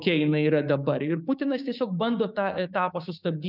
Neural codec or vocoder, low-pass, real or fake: codec, 16 kHz, 6 kbps, DAC; 5.4 kHz; fake